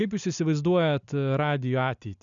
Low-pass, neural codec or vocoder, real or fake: 7.2 kHz; none; real